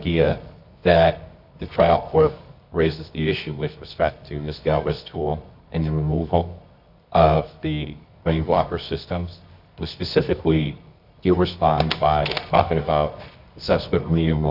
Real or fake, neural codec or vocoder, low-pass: fake; codec, 24 kHz, 0.9 kbps, WavTokenizer, medium music audio release; 5.4 kHz